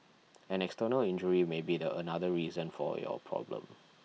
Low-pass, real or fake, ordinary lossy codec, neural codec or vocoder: none; real; none; none